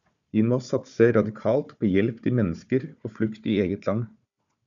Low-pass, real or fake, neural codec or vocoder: 7.2 kHz; fake; codec, 16 kHz, 4 kbps, FunCodec, trained on Chinese and English, 50 frames a second